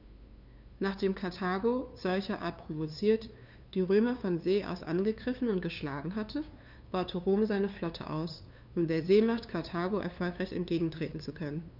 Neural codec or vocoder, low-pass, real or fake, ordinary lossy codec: codec, 16 kHz, 2 kbps, FunCodec, trained on LibriTTS, 25 frames a second; 5.4 kHz; fake; none